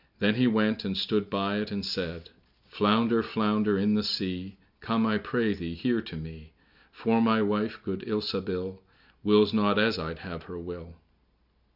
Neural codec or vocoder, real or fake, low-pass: none; real; 5.4 kHz